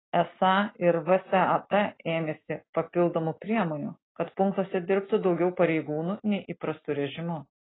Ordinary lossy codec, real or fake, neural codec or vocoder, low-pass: AAC, 16 kbps; real; none; 7.2 kHz